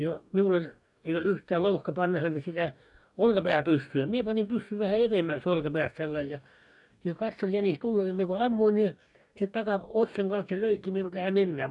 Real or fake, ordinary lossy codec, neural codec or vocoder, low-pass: fake; none; codec, 44.1 kHz, 2.6 kbps, DAC; 10.8 kHz